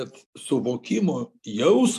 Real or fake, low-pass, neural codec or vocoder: real; 14.4 kHz; none